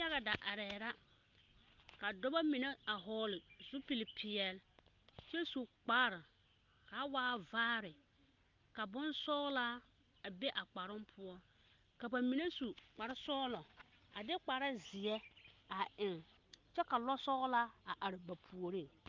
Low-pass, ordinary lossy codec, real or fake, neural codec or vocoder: 7.2 kHz; Opus, 24 kbps; real; none